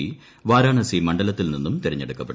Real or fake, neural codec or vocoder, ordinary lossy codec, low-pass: real; none; none; none